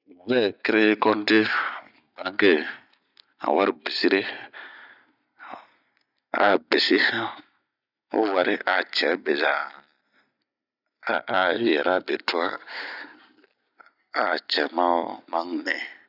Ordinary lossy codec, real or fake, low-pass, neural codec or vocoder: none; real; 5.4 kHz; none